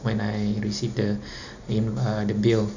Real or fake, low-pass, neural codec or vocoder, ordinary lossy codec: real; 7.2 kHz; none; none